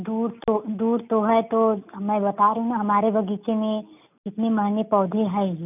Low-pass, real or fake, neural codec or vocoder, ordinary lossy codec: 3.6 kHz; real; none; none